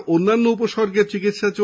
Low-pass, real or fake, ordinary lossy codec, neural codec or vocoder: none; real; none; none